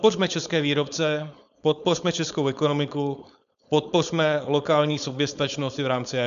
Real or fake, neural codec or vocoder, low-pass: fake; codec, 16 kHz, 4.8 kbps, FACodec; 7.2 kHz